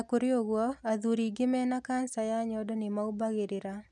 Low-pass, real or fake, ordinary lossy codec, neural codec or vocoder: none; real; none; none